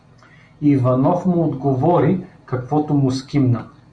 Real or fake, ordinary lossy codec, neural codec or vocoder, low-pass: real; Opus, 64 kbps; none; 9.9 kHz